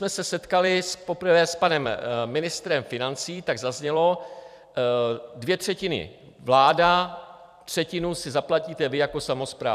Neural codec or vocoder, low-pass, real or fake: vocoder, 44.1 kHz, 128 mel bands every 256 samples, BigVGAN v2; 14.4 kHz; fake